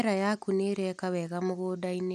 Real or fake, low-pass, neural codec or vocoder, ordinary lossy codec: real; 14.4 kHz; none; none